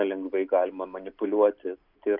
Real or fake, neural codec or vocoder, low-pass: real; none; 5.4 kHz